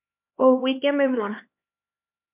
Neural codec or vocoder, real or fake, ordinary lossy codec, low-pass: codec, 16 kHz, 2 kbps, X-Codec, HuBERT features, trained on LibriSpeech; fake; MP3, 32 kbps; 3.6 kHz